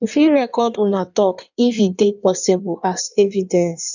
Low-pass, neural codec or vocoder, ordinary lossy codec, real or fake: 7.2 kHz; codec, 16 kHz in and 24 kHz out, 1.1 kbps, FireRedTTS-2 codec; none; fake